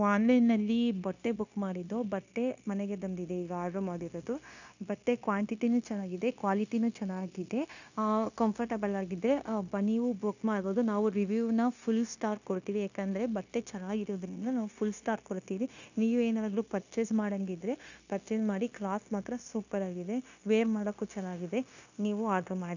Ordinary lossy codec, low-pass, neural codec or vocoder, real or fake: none; 7.2 kHz; codec, 16 kHz, 0.9 kbps, LongCat-Audio-Codec; fake